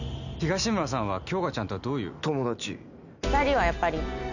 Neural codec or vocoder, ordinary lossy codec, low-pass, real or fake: none; none; 7.2 kHz; real